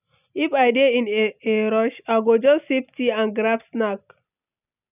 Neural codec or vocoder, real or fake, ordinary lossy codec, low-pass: none; real; none; 3.6 kHz